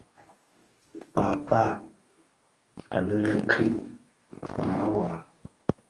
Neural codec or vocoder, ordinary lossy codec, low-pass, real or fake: codec, 44.1 kHz, 2.6 kbps, DAC; Opus, 32 kbps; 10.8 kHz; fake